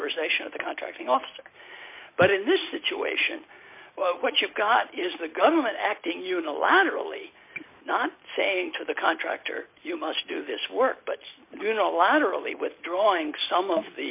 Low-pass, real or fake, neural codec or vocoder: 3.6 kHz; real; none